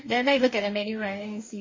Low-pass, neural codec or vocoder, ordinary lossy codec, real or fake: 7.2 kHz; codec, 44.1 kHz, 2.6 kbps, DAC; MP3, 32 kbps; fake